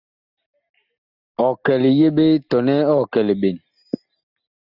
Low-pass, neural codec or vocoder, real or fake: 5.4 kHz; none; real